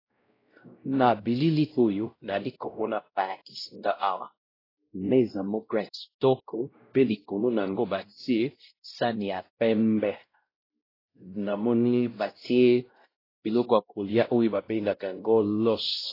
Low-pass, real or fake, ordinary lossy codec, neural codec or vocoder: 5.4 kHz; fake; AAC, 24 kbps; codec, 16 kHz, 0.5 kbps, X-Codec, WavLM features, trained on Multilingual LibriSpeech